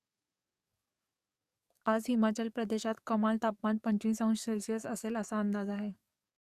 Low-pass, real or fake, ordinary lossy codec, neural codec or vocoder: 14.4 kHz; fake; Opus, 64 kbps; codec, 44.1 kHz, 7.8 kbps, DAC